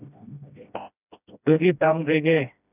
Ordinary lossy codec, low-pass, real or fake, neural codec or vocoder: none; 3.6 kHz; fake; codec, 16 kHz, 1 kbps, FreqCodec, smaller model